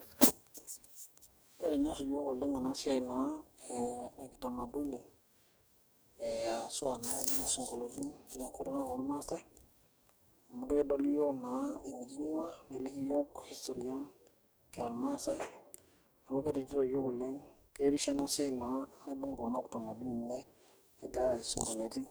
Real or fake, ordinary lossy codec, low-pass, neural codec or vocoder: fake; none; none; codec, 44.1 kHz, 2.6 kbps, DAC